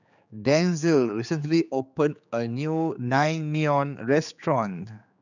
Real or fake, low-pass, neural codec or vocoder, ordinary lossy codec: fake; 7.2 kHz; codec, 16 kHz, 4 kbps, X-Codec, HuBERT features, trained on general audio; none